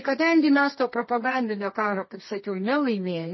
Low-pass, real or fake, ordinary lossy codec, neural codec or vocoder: 7.2 kHz; fake; MP3, 24 kbps; codec, 24 kHz, 0.9 kbps, WavTokenizer, medium music audio release